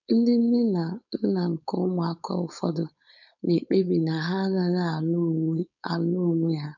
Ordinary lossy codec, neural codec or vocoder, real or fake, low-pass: none; codec, 16 kHz, 4.8 kbps, FACodec; fake; 7.2 kHz